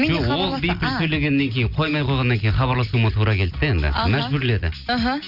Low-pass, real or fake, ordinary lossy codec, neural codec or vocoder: 5.4 kHz; real; none; none